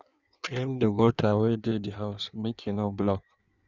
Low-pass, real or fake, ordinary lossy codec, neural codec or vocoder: 7.2 kHz; fake; none; codec, 16 kHz in and 24 kHz out, 1.1 kbps, FireRedTTS-2 codec